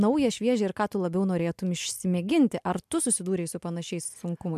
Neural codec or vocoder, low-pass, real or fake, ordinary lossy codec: none; 14.4 kHz; real; MP3, 96 kbps